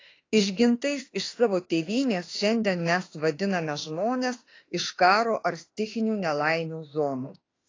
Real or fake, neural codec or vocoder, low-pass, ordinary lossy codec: fake; autoencoder, 48 kHz, 32 numbers a frame, DAC-VAE, trained on Japanese speech; 7.2 kHz; AAC, 32 kbps